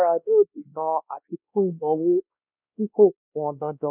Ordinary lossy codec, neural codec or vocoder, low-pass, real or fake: none; codec, 16 kHz, 1 kbps, X-Codec, WavLM features, trained on Multilingual LibriSpeech; 3.6 kHz; fake